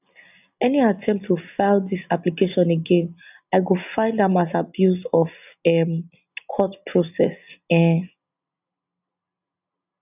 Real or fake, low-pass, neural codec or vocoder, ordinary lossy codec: real; 3.6 kHz; none; none